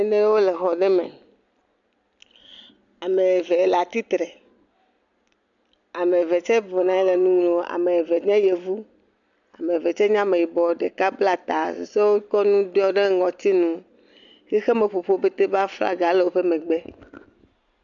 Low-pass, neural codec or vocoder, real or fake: 7.2 kHz; none; real